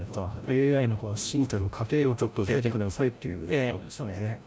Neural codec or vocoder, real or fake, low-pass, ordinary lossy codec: codec, 16 kHz, 0.5 kbps, FreqCodec, larger model; fake; none; none